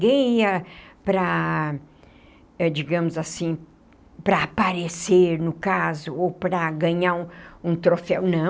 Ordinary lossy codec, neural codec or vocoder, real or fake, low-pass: none; none; real; none